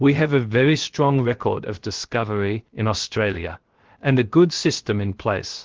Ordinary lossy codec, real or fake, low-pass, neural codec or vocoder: Opus, 16 kbps; fake; 7.2 kHz; codec, 16 kHz, 0.7 kbps, FocalCodec